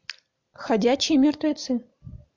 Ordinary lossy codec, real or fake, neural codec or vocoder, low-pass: MP3, 64 kbps; real; none; 7.2 kHz